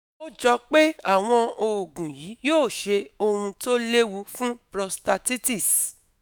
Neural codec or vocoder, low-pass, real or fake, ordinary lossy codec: autoencoder, 48 kHz, 128 numbers a frame, DAC-VAE, trained on Japanese speech; none; fake; none